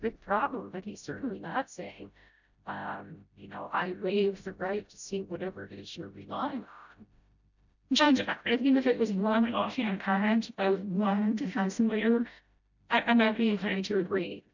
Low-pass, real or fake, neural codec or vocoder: 7.2 kHz; fake; codec, 16 kHz, 0.5 kbps, FreqCodec, smaller model